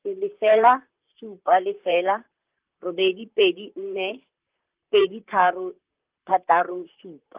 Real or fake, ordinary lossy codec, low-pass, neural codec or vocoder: fake; Opus, 24 kbps; 3.6 kHz; vocoder, 44.1 kHz, 128 mel bands, Pupu-Vocoder